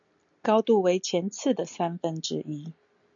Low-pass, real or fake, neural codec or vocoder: 7.2 kHz; real; none